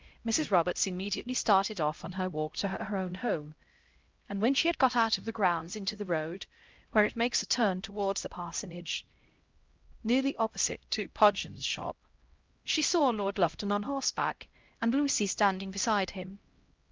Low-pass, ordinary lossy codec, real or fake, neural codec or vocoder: 7.2 kHz; Opus, 24 kbps; fake; codec, 16 kHz, 0.5 kbps, X-Codec, HuBERT features, trained on LibriSpeech